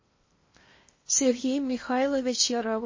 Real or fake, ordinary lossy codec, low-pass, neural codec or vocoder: fake; MP3, 32 kbps; 7.2 kHz; codec, 16 kHz in and 24 kHz out, 0.8 kbps, FocalCodec, streaming, 65536 codes